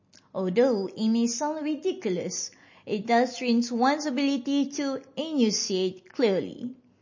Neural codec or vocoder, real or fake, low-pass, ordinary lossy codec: none; real; 7.2 kHz; MP3, 32 kbps